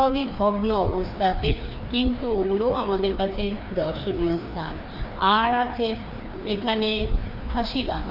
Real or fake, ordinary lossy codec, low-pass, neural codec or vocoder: fake; none; 5.4 kHz; codec, 16 kHz, 2 kbps, FreqCodec, larger model